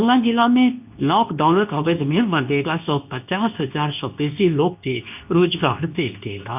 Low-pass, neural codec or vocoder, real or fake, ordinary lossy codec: 3.6 kHz; codec, 24 kHz, 0.9 kbps, WavTokenizer, medium speech release version 2; fake; none